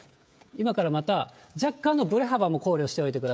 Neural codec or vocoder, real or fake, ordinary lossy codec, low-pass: codec, 16 kHz, 16 kbps, FreqCodec, smaller model; fake; none; none